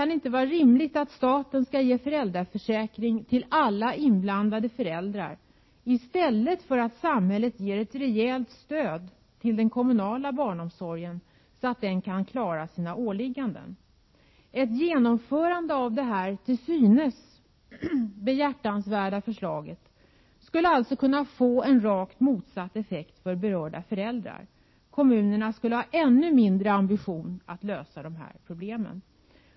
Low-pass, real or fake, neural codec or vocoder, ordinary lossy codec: 7.2 kHz; real; none; MP3, 24 kbps